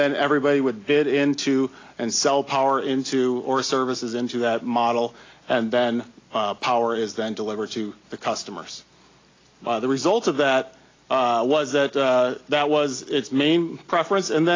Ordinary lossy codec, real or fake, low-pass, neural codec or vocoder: AAC, 32 kbps; real; 7.2 kHz; none